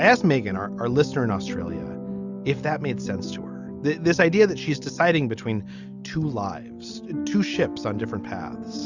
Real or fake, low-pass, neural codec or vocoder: real; 7.2 kHz; none